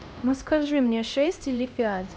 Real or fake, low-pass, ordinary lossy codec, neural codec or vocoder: fake; none; none; codec, 16 kHz, 1 kbps, X-Codec, HuBERT features, trained on LibriSpeech